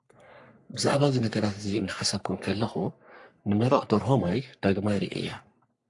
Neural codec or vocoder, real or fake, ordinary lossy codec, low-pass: codec, 44.1 kHz, 3.4 kbps, Pupu-Codec; fake; AAC, 64 kbps; 10.8 kHz